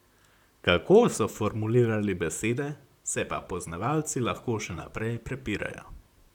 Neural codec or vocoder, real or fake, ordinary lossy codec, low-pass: vocoder, 44.1 kHz, 128 mel bands, Pupu-Vocoder; fake; none; 19.8 kHz